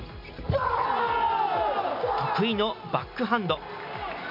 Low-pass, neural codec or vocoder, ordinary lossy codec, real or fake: 5.4 kHz; none; MP3, 32 kbps; real